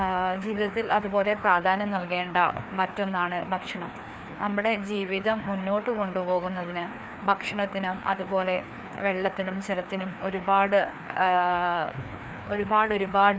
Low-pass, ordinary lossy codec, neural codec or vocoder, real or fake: none; none; codec, 16 kHz, 2 kbps, FreqCodec, larger model; fake